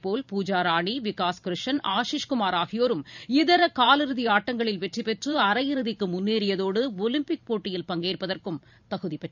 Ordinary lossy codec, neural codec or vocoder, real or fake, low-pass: none; vocoder, 44.1 kHz, 128 mel bands every 512 samples, BigVGAN v2; fake; 7.2 kHz